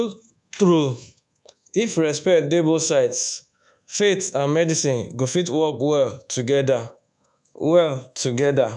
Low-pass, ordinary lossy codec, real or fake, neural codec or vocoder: 10.8 kHz; none; fake; codec, 24 kHz, 1.2 kbps, DualCodec